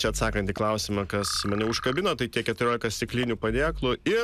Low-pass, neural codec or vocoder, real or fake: 14.4 kHz; none; real